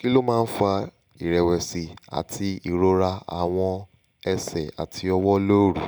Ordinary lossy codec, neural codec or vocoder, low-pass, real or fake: none; none; none; real